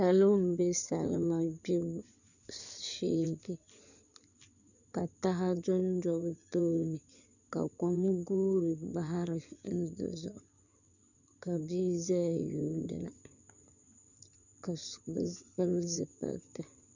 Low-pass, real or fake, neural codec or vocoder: 7.2 kHz; fake; codec, 16 kHz in and 24 kHz out, 2.2 kbps, FireRedTTS-2 codec